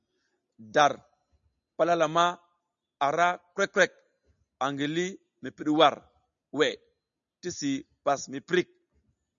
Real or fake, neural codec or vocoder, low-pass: real; none; 7.2 kHz